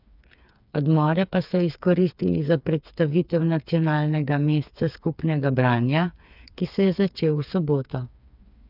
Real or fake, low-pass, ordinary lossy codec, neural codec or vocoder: fake; 5.4 kHz; none; codec, 16 kHz, 4 kbps, FreqCodec, smaller model